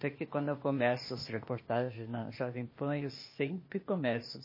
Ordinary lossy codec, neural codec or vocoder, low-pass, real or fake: MP3, 24 kbps; codec, 16 kHz, 0.8 kbps, ZipCodec; 5.4 kHz; fake